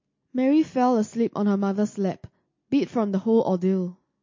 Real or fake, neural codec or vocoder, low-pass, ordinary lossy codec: real; none; 7.2 kHz; MP3, 32 kbps